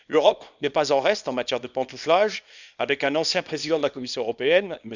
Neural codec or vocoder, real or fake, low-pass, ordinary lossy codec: codec, 24 kHz, 0.9 kbps, WavTokenizer, small release; fake; 7.2 kHz; none